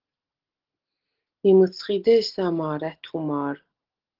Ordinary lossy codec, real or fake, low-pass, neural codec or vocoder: Opus, 16 kbps; real; 5.4 kHz; none